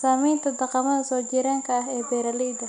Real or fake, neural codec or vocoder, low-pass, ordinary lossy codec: real; none; 9.9 kHz; none